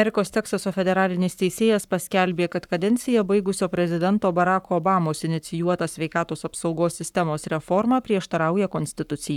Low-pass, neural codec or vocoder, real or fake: 19.8 kHz; codec, 44.1 kHz, 7.8 kbps, Pupu-Codec; fake